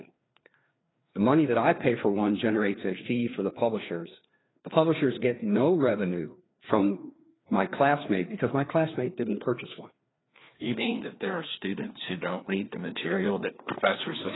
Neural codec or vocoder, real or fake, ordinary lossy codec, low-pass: codec, 16 kHz, 2 kbps, FreqCodec, larger model; fake; AAC, 16 kbps; 7.2 kHz